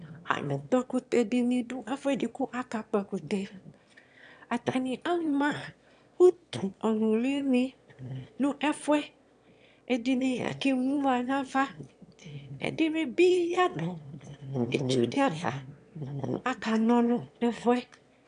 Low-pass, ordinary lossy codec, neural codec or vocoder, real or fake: 9.9 kHz; AAC, 96 kbps; autoencoder, 22.05 kHz, a latent of 192 numbers a frame, VITS, trained on one speaker; fake